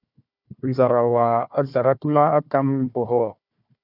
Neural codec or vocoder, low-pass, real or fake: codec, 16 kHz, 1 kbps, FunCodec, trained on Chinese and English, 50 frames a second; 5.4 kHz; fake